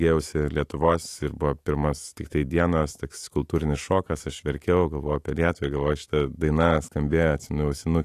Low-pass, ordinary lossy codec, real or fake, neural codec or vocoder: 14.4 kHz; AAC, 48 kbps; fake; autoencoder, 48 kHz, 128 numbers a frame, DAC-VAE, trained on Japanese speech